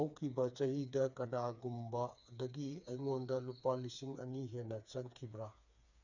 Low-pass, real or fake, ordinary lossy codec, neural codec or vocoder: 7.2 kHz; fake; none; codec, 16 kHz, 4 kbps, FreqCodec, smaller model